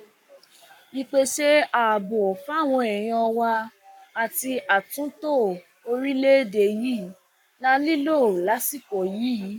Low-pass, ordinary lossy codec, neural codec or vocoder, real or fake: 19.8 kHz; none; codec, 44.1 kHz, 7.8 kbps, Pupu-Codec; fake